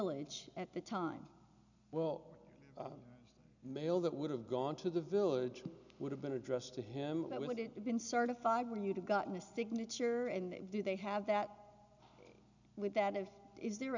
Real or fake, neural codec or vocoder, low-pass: real; none; 7.2 kHz